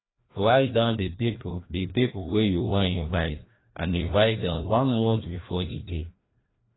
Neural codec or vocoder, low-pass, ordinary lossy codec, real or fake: codec, 16 kHz, 1 kbps, FreqCodec, larger model; 7.2 kHz; AAC, 16 kbps; fake